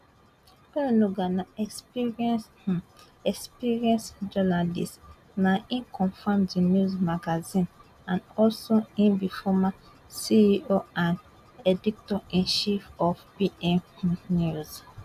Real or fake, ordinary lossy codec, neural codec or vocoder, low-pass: real; none; none; 14.4 kHz